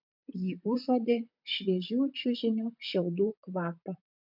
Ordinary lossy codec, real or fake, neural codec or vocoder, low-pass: MP3, 48 kbps; fake; vocoder, 44.1 kHz, 128 mel bands, Pupu-Vocoder; 5.4 kHz